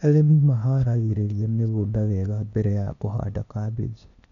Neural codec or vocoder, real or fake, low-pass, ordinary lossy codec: codec, 16 kHz, 0.8 kbps, ZipCodec; fake; 7.2 kHz; none